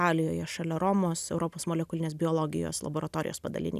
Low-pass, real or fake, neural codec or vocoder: 14.4 kHz; real; none